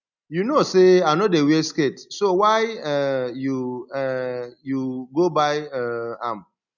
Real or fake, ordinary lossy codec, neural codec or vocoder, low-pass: real; none; none; 7.2 kHz